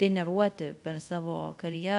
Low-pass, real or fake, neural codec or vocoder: 10.8 kHz; fake; codec, 24 kHz, 0.5 kbps, DualCodec